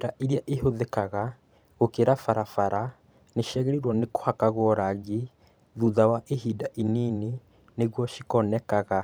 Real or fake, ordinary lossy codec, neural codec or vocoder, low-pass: fake; none; vocoder, 44.1 kHz, 128 mel bands every 512 samples, BigVGAN v2; none